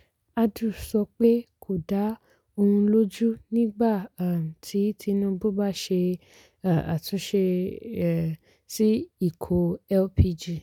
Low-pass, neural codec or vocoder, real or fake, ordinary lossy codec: 19.8 kHz; none; real; none